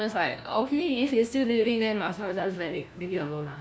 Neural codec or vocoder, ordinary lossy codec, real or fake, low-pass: codec, 16 kHz, 1 kbps, FunCodec, trained on LibriTTS, 50 frames a second; none; fake; none